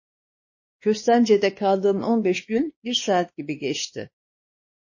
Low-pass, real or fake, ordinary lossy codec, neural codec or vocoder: 7.2 kHz; fake; MP3, 32 kbps; codec, 16 kHz, 2 kbps, X-Codec, WavLM features, trained on Multilingual LibriSpeech